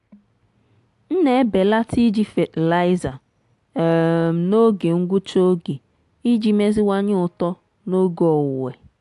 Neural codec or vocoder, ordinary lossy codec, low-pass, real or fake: none; AAC, 64 kbps; 10.8 kHz; real